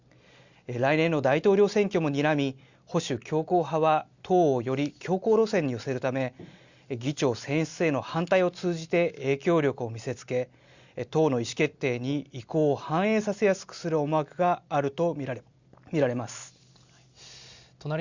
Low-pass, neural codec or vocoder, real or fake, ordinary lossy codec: 7.2 kHz; none; real; Opus, 64 kbps